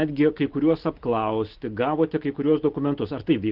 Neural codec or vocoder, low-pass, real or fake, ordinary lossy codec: none; 5.4 kHz; real; Opus, 16 kbps